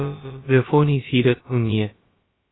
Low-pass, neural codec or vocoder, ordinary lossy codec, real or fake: 7.2 kHz; codec, 16 kHz, about 1 kbps, DyCAST, with the encoder's durations; AAC, 16 kbps; fake